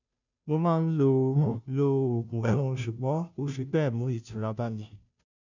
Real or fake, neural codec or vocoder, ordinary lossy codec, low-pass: fake; codec, 16 kHz, 0.5 kbps, FunCodec, trained on Chinese and English, 25 frames a second; none; 7.2 kHz